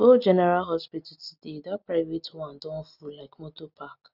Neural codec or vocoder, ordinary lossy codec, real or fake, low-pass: none; none; real; 5.4 kHz